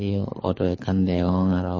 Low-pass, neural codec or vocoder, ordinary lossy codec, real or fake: 7.2 kHz; codec, 24 kHz, 6 kbps, HILCodec; MP3, 32 kbps; fake